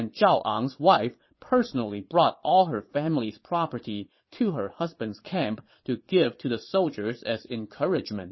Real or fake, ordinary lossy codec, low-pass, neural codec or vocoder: fake; MP3, 24 kbps; 7.2 kHz; vocoder, 22.05 kHz, 80 mel bands, Vocos